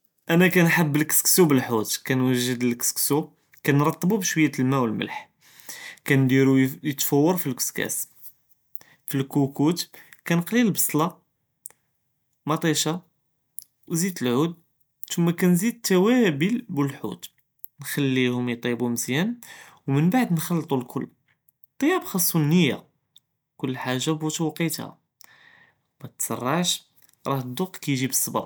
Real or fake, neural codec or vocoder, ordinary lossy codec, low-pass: real; none; none; none